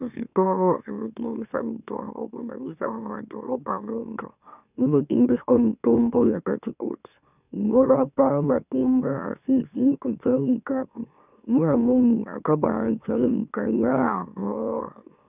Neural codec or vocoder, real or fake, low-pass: autoencoder, 44.1 kHz, a latent of 192 numbers a frame, MeloTTS; fake; 3.6 kHz